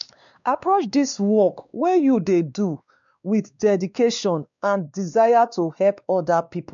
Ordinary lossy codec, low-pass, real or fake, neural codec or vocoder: none; 7.2 kHz; fake; codec, 16 kHz, 2 kbps, X-Codec, WavLM features, trained on Multilingual LibriSpeech